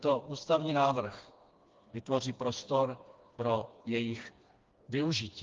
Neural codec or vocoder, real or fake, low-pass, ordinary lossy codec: codec, 16 kHz, 2 kbps, FreqCodec, smaller model; fake; 7.2 kHz; Opus, 32 kbps